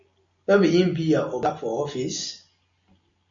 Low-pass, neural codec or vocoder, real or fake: 7.2 kHz; none; real